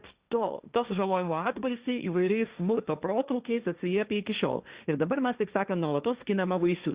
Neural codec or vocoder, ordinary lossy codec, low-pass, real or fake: codec, 16 kHz, 1.1 kbps, Voila-Tokenizer; Opus, 64 kbps; 3.6 kHz; fake